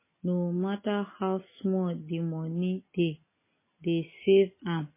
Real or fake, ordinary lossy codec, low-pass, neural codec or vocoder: real; MP3, 16 kbps; 3.6 kHz; none